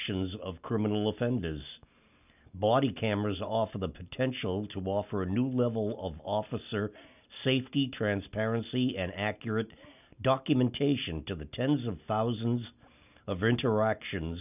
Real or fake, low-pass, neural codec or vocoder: real; 3.6 kHz; none